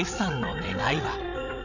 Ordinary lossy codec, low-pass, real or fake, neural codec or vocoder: none; 7.2 kHz; fake; codec, 16 kHz, 16 kbps, FreqCodec, larger model